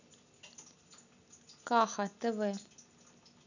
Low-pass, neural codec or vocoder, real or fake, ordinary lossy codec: 7.2 kHz; none; real; none